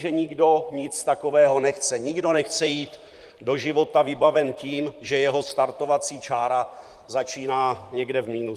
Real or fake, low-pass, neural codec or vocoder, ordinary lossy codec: fake; 14.4 kHz; vocoder, 44.1 kHz, 128 mel bands, Pupu-Vocoder; Opus, 32 kbps